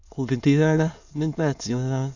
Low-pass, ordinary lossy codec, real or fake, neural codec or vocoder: 7.2 kHz; none; fake; autoencoder, 22.05 kHz, a latent of 192 numbers a frame, VITS, trained on many speakers